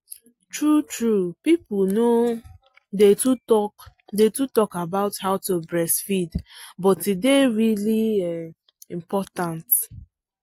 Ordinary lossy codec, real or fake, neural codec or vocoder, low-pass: AAC, 48 kbps; real; none; 19.8 kHz